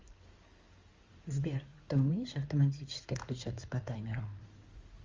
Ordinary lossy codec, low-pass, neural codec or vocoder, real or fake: Opus, 32 kbps; 7.2 kHz; codec, 16 kHz in and 24 kHz out, 2.2 kbps, FireRedTTS-2 codec; fake